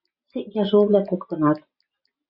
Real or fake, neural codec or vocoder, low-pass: real; none; 5.4 kHz